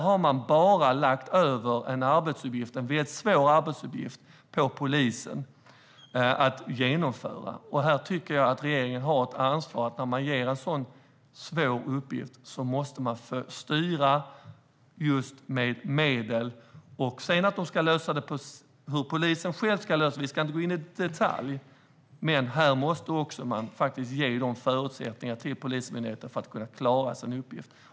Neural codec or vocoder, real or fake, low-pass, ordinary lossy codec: none; real; none; none